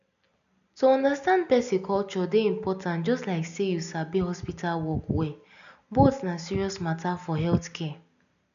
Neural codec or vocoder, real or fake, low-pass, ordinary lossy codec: none; real; 7.2 kHz; none